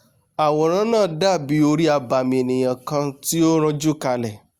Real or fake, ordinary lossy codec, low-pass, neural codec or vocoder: real; Opus, 64 kbps; 19.8 kHz; none